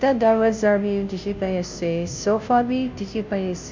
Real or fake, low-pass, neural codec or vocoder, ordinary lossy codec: fake; 7.2 kHz; codec, 16 kHz, 0.5 kbps, FunCodec, trained on Chinese and English, 25 frames a second; MP3, 64 kbps